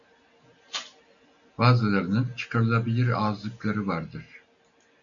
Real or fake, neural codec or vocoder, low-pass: real; none; 7.2 kHz